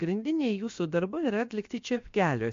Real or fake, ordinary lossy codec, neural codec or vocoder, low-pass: fake; MP3, 48 kbps; codec, 16 kHz, 0.7 kbps, FocalCodec; 7.2 kHz